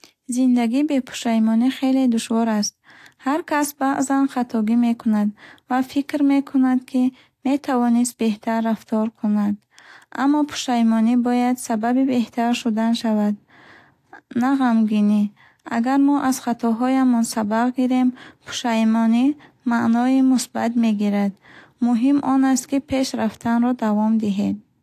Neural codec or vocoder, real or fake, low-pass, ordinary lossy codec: none; real; 14.4 kHz; AAC, 64 kbps